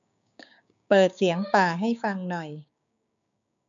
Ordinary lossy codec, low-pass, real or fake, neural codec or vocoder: none; 7.2 kHz; fake; codec, 16 kHz, 6 kbps, DAC